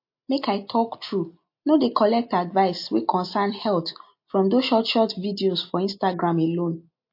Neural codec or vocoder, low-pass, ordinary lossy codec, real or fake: none; 5.4 kHz; MP3, 32 kbps; real